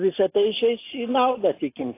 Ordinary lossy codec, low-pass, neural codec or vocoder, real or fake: AAC, 24 kbps; 3.6 kHz; none; real